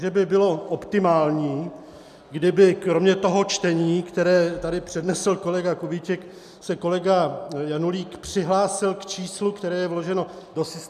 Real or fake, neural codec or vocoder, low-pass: real; none; 14.4 kHz